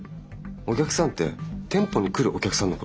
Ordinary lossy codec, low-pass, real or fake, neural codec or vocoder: none; none; real; none